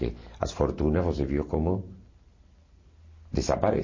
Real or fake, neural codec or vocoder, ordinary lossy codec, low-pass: real; none; MP3, 32 kbps; 7.2 kHz